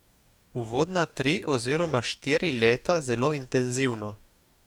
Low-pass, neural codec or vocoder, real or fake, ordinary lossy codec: 19.8 kHz; codec, 44.1 kHz, 2.6 kbps, DAC; fake; none